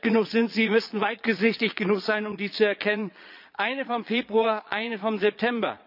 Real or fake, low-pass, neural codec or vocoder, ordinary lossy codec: fake; 5.4 kHz; vocoder, 22.05 kHz, 80 mel bands, Vocos; none